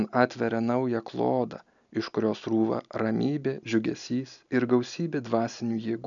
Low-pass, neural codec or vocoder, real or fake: 7.2 kHz; none; real